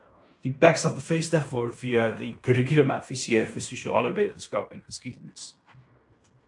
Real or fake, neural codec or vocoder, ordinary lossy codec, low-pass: fake; codec, 16 kHz in and 24 kHz out, 0.9 kbps, LongCat-Audio-Codec, fine tuned four codebook decoder; AAC, 64 kbps; 10.8 kHz